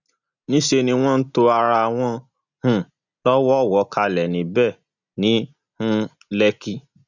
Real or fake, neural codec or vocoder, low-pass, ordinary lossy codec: real; none; 7.2 kHz; none